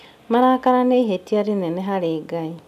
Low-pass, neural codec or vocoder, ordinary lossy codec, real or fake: 14.4 kHz; none; none; real